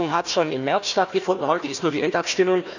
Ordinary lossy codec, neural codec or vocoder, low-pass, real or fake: none; codec, 16 kHz, 1 kbps, FunCodec, trained on Chinese and English, 50 frames a second; 7.2 kHz; fake